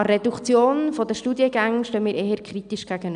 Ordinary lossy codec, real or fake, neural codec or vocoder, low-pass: none; real; none; 9.9 kHz